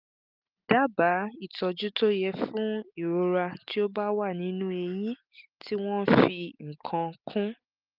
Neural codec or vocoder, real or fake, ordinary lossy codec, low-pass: none; real; Opus, 24 kbps; 5.4 kHz